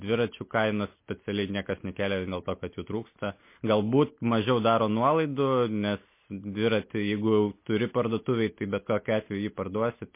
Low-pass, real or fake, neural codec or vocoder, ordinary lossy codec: 3.6 kHz; real; none; MP3, 24 kbps